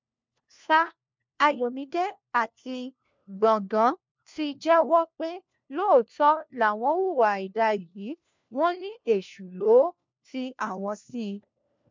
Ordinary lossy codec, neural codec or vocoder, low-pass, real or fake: MP3, 64 kbps; codec, 16 kHz, 1 kbps, FunCodec, trained on LibriTTS, 50 frames a second; 7.2 kHz; fake